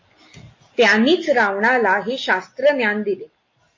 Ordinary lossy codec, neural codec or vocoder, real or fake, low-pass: MP3, 32 kbps; none; real; 7.2 kHz